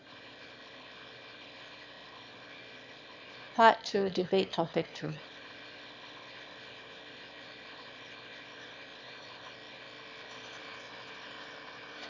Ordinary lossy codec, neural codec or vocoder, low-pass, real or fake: none; autoencoder, 22.05 kHz, a latent of 192 numbers a frame, VITS, trained on one speaker; 7.2 kHz; fake